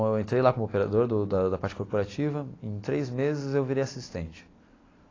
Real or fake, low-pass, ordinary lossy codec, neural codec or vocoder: real; 7.2 kHz; AAC, 32 kbps; none